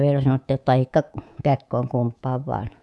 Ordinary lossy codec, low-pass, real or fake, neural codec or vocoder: none; none; fake; codec, 24 kHz, 3.1 kbps, DualCodec